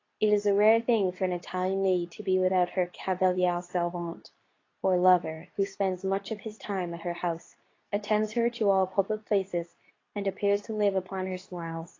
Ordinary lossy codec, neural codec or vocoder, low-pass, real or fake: AAC, 32 kbps; codec, 24 kHz, 0.9 kbps, WavTokenizer, medium speech release version 2; 7.2 kHz; fake